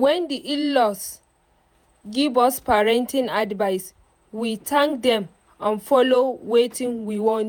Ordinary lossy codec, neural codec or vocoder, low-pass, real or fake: none; vocoder, 48 kHz, 128 mel bands, Vocos; none; fake